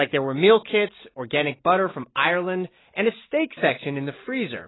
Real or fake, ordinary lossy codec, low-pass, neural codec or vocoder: real; AAC, 16 kbps; 7.2 kHz; none